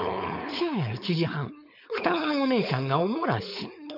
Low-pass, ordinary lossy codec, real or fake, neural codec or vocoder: 5.4 kHz; none; fake; codec, 16 kHz, 4.8 kbps, FACodec